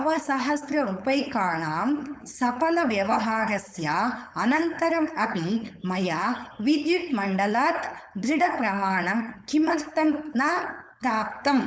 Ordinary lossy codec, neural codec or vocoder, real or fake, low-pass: none; codec, 16 kHz, 4.8 kbps, FACodec; fake; none